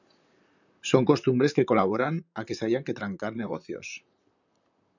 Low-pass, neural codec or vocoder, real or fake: 7.2 kHz; vocoder, 44.1 kHz, 128 mel bands, Pupu-Vocoder; fake